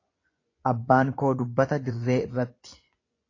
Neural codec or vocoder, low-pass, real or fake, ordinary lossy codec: vocoder, 24 kHz, 100 mel bands, Vocos; 7.2 kHz; fake; AAC, 32 kbps